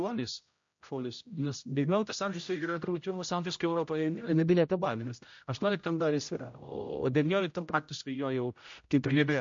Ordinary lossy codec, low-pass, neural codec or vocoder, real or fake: MP3, 48 kbps; 7.2 kHz; codec, 16 kHz, 0.5 kbps, X-Codec, HuBERT features, trained on general audio; fake